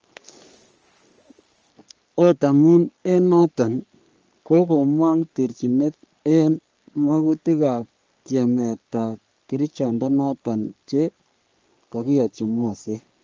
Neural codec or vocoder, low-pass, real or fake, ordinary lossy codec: codec, 44.1 kHz, 3.4 kbps, Pupu-Codec; 7.2 kHz; fake; Opus, 24 kbps